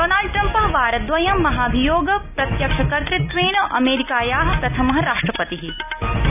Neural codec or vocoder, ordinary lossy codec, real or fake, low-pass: none; none; real; 3.6 kHz